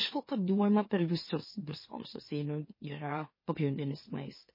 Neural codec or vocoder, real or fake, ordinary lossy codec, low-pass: autoencoder, 44.1 kHz, a latent of 192 numbers a frame, MeloTTS; fake; MP3, 24 kbps; 5.4 kHz